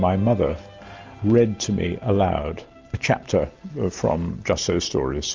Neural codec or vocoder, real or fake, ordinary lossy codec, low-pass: none; real; Opus, 32 kbps; 7.2 kHz